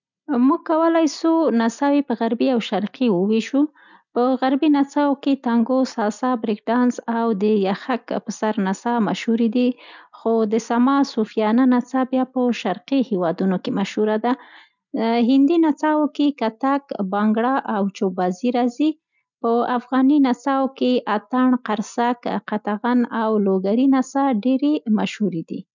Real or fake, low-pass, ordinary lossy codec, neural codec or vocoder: real; 7.2 kHz; none; none